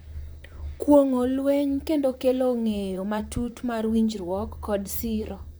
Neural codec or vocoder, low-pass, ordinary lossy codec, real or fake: vocoder, 44.1 kHz, 128 mel bands, Pupu-Vocoder; none; none; fake